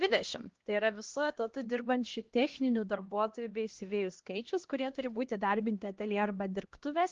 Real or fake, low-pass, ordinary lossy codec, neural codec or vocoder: fake; 7.2 kHz; Opus, 16 kbps; codec, 16 kHz, 1 kbps, X-Codec, HuBERT features, trained on LibriSpeech